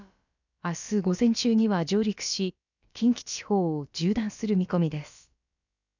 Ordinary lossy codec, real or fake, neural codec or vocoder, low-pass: none; fake; codec, 16 kHz, about 1 kbps, DyCAST, with the encoder's durations; 7.2 kHz